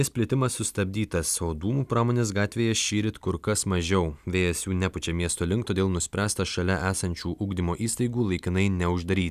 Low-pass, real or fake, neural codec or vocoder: 14.4 kHz; real; none